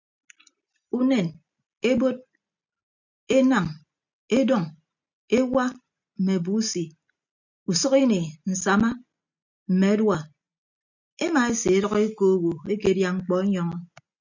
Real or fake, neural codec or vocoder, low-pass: real; none; 7.2 kHz